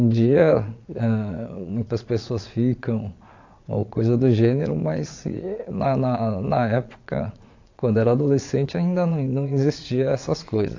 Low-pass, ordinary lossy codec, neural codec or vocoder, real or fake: 7.2 kHz; AAC, 48 kbps; vocoder, 22.05 kHz, 80 mel bands, Vocos; fake